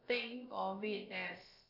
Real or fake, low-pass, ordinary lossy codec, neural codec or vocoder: fake; 5.4 kHz; none; codec, 16 kHz, about 1 kbps, DyCAST, with the encoder's durations